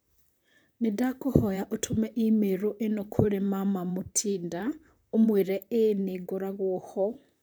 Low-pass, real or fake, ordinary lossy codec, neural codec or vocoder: none; fake; none; vocoder, 44.1 kHz, 128 mel bands, Pupu-Vocoder